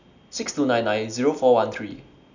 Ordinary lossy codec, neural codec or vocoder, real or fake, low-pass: none; none; real; 7.2 kHz